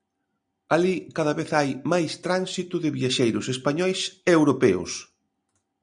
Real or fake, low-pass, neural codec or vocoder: real; 9.9 kHz; none